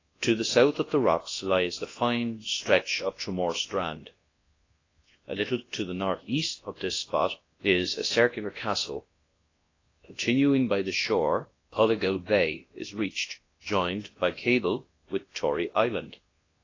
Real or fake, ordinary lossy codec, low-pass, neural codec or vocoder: fake; AAC, 32 kbps; 7.2 kHz; codec, 24 kHz, 0.9 kbps, WavTokenizer, large speech release